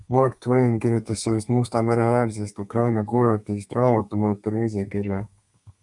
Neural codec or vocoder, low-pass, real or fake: codec, 32 kHz, 1.9 kbps, SNAC; 10.8 kHz; fake